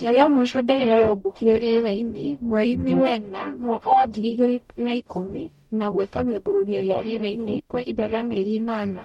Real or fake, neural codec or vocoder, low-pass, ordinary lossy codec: fake; codec, 44.1 kHz, 0.9 kbps, DAC; 19.8 kHz; MP3, 64 kbps